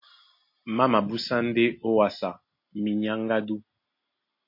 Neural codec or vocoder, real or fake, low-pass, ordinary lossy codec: none; real; 5.4 kHz; MP3, 32 kbps